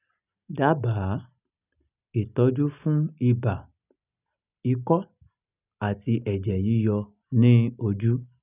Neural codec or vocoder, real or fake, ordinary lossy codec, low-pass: none; real; none; 3.6 kHz